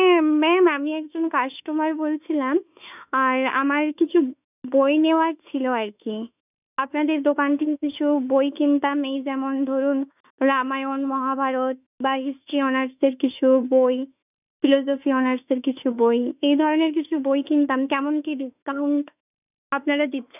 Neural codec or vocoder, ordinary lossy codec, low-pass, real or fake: autoencoder, 48 kHz, 32 numbers a frame, DAC-VAE, trained on Japanese speech; none; 3.6 kHz; fake